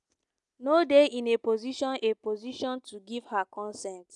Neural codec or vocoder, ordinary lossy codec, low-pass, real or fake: none; none; 10.8 kHz; real